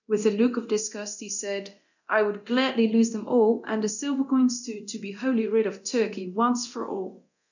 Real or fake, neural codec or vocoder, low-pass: fake; codec, 24 kHz, 0.9 kbps, DualCodec; 7.2 kHz